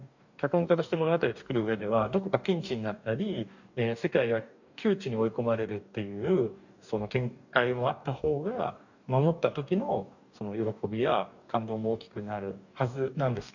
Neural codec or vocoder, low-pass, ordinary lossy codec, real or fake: codec, 44.1 kHz, 2.6 kbps, DAC; 7.2 kHz; none; fake